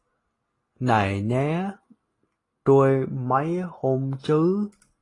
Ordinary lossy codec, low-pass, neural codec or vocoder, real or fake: AAC, 32 kbps; 10.8 kHz; none; real